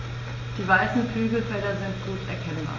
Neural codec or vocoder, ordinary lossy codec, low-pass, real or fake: none; MP3, 32 kbps; 7.2 kHz; real